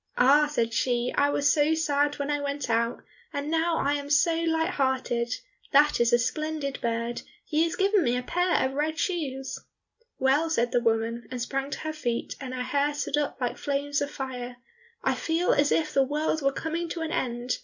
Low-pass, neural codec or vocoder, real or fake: 7.2 kHz; none; real